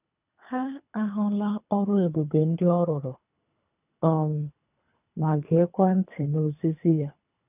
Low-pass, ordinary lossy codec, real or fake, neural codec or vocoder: 3.6 kHz; none; fake; codec, 24 kHz, 3 kbps, HILCodec